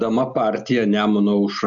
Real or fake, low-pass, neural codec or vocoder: real; 7.2 kHz; none